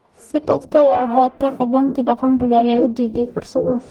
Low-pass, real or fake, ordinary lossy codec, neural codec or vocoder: 19.8 kHz; fake; Opus, 32 kbps; codec, 44.1 kHz, 0.9 kbps, DAC